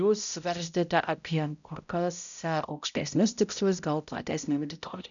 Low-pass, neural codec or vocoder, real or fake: 7.2 kHz; codec, 16 kHz, 0.5 kbps, X-Codec, HuBERT features, trained on balanced general audio; fake